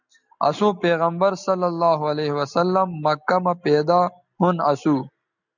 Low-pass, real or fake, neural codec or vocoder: 7.2 kHz; real; none